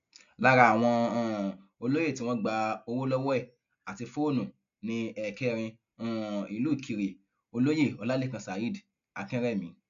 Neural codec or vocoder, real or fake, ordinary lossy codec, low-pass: none; real; AAC, 64 kbps; 7.2 kHz